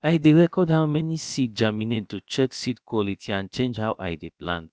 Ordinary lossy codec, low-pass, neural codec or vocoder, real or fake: none; none; codec, 16 kHz, about 1 kbps, DyCAST, with the encoder's durations; fake